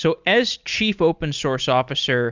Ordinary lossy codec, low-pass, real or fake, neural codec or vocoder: Opus, 64 kbps; 7.2 kHz; real; none